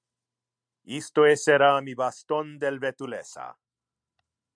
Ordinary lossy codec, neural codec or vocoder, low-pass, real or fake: MP3, 96 kbps; none; 9.9 kHz; real